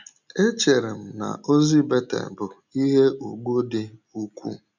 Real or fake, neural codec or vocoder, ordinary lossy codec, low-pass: real; none; none; 7.2 kHz